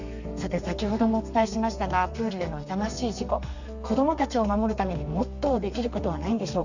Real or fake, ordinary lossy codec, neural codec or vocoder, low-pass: fake; none; codec, 32 kHz, 1.9 kbps, SNAC; 7.2 kHz